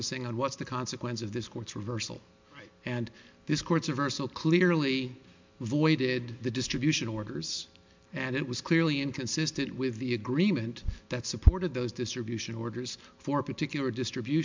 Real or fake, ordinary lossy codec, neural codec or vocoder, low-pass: real; MP3, 64 kbps; none; 7.2 kHz